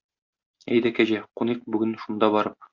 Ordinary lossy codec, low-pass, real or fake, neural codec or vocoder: MP3, 64 kbps; 7.2 kHz; real; none